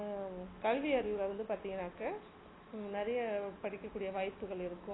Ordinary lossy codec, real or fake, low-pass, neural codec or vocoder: AAC, 16 kbps; real; 7.2 kHz; none